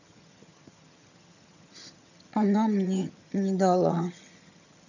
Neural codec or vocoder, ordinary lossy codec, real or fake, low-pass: vocoder, 22.05 kHz, 80 mel bands, HiFi-GAN; none; fake; 7.2 kHz